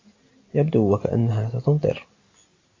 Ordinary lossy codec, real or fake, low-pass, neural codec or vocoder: AAC, 32 kbps; real; 7.2 kHz; none